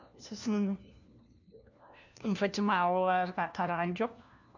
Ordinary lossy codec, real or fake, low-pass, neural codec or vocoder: none; fake; 7.2 kHz; codec, 16 kHz, 1 kbps, FunCodec, trained on LibriTTS, 50 frames a second